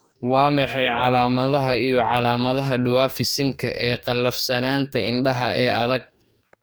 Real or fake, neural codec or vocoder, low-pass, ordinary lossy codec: fake; codec, 44.1 kHz, 2.6 kbps, DAC; none; none